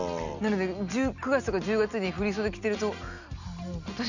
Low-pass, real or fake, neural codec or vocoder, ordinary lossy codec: 7.2 kHz; real; none; none